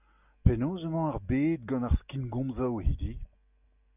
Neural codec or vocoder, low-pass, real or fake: none; 3.6 kHz; real